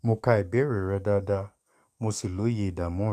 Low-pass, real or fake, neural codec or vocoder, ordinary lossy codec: 14.4 kHz; fake; codec, 44.1 kHz, 7.8 kbps, DAC; none